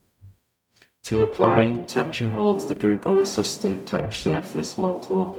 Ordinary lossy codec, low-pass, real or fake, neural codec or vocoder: none; 19.8 kHz; fake; codec, 44.1 kHz, 0.9 kbps, DAC